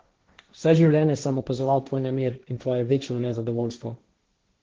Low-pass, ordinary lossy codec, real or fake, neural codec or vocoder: 7.2 kHz; Opus, 16 kbps; fake; codec, 16 kHz, 1.1 kbps, Voila-Tokenizer